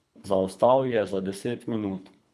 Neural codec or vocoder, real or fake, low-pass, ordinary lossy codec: codec, 24 kHz, 3 kbps, HILCodec; fake; none; none